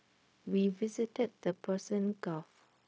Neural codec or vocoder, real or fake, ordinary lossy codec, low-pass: codec, 16 kHz, 0.4 kbps, LongCat-Audio-Codec; fake; none; none